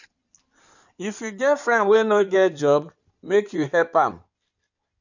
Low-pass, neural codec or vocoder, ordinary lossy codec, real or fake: 7.2 kHz; codec, 16 kHz in and 24 kHz out, 2.2 kbps, FireRedTTS-2 codec; none; fake